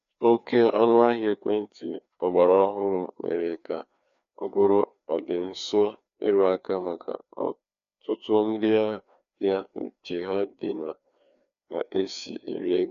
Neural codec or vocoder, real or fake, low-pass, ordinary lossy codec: codec, 16 kHz, 2 kbps, FreqCodec, larger model; fake; 7.2 kHz; none